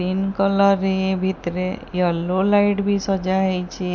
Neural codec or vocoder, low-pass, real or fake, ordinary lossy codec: none; none; real; none